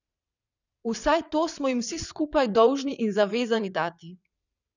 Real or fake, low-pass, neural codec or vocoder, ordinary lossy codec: fake; 7.2 kHz; vocoder, 22.05 kHz, 80 mel bands, WaveNeXt; none